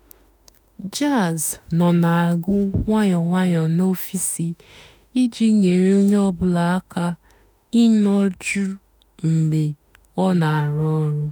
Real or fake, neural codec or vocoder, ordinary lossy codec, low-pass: fake; autoencoder, 48 kHz, 32 numbers a frame, DAC-VAE, trained on Japanese speech; none; none